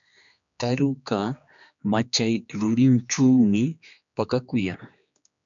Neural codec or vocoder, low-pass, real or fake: codec, 16 kHz, 2 kbps, X-Codec, HuBERT features, trained on general audio; 7.2 kHz; fake